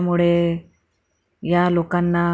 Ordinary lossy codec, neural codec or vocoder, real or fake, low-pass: none; none; real; none